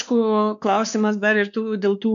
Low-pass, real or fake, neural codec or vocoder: 7.2 kHz; fake; codec, 16 kHz, 2 kbps, X-Codec, WavLM features, trained on Multilingual LibriSpeech